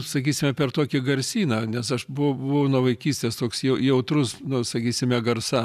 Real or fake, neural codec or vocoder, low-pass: real; none; 14.4 kHz